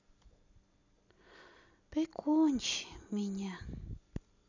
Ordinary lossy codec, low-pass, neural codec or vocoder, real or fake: none; 7.2 kHz; none; real